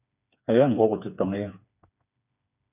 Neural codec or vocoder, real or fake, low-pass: codec, 16 kHz, 4 kbps, FreqCodec, smaller model; fake; 3.6 kHz